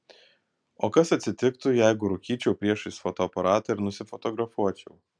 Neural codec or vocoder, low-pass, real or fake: none; 9.9 kHz; real